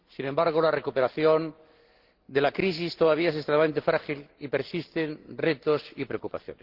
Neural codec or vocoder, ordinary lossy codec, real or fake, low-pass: none; Opus, 16 kbps; real; 5.4 kHz